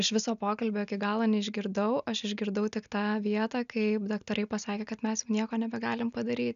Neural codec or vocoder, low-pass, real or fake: none; 7.2 kHz; real